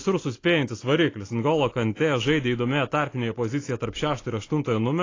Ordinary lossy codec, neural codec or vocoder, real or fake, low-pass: AAC, 32 kbps; none; real; 7.2 kHz